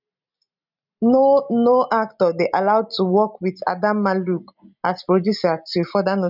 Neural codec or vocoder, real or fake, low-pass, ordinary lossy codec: none; real; 5.4 kHz; none